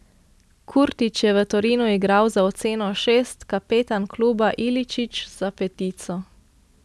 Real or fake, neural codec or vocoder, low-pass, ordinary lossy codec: real; none; none; none